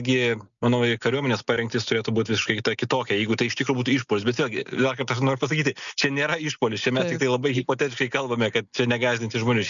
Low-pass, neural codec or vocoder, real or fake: 7.2 kHz; none; real